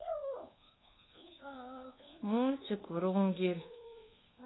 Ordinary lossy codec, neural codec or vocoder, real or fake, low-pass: AAC, 16 kbps; codec, 24 kHz, 1.2 kbps, DualCodec; fake; 7.2 kHz